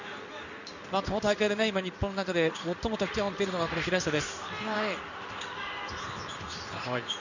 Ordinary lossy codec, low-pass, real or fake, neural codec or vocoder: none; 7.2 kHz; fake; codec, 16 kHz in and 24 kHz out, 1 kbps, XY-Tokenizer